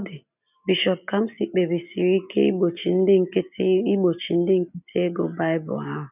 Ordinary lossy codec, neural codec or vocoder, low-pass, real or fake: none; none; 3.6 kHz; real